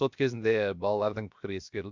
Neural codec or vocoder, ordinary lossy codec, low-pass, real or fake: codec, 16 kHz, 0.7 kbps, FocalCodec; MP3, 64 kbps; 7.2 kHz; fake